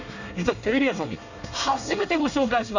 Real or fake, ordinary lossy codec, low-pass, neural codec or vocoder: fake; none; 7.2 kHz; codec, 24 kHz, 1 kbps, SNAC